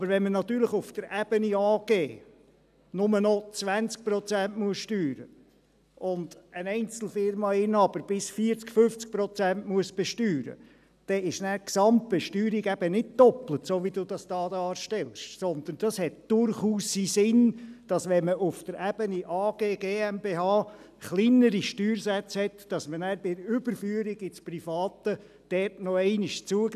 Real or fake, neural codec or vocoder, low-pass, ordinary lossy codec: real; none; 14.4 kHz; none